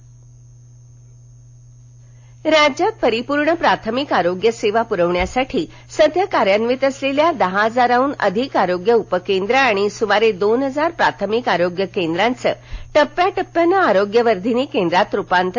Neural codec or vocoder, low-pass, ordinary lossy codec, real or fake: none; 7.2 kHz; AAC, 48 kbps; real